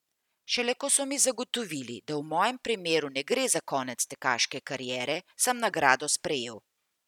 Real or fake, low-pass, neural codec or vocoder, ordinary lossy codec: real; 19.8 kHz; none; none